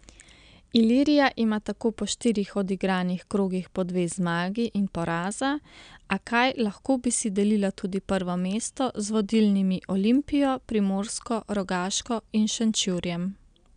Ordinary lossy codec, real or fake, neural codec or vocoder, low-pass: none; real; none; 9.9 kHz